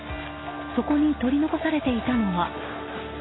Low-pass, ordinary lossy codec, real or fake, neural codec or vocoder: 7.2 kHz; AAC, 16 kbps; real; none